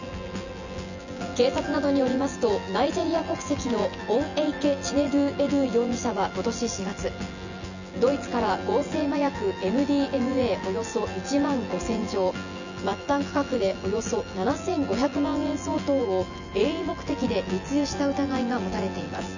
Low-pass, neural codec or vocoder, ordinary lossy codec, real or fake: 7.2 kHz; vocoder, 24 kHz, 100 mel bands, Vocos; none; fake